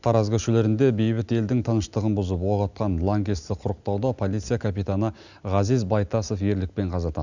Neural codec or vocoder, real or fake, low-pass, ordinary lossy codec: none; real; 7.2 kHz; none